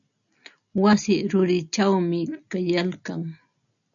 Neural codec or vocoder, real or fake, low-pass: none; real; 7.2 kHz